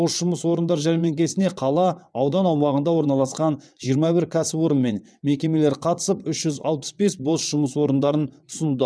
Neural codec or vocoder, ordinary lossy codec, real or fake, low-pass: vocoder, 22.05 kHz, 80 mel bands, WaveNeXt; none; fake; none